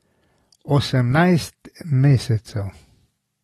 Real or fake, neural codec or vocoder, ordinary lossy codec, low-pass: real; none; AAC, 32 kbps; 19.8 kHz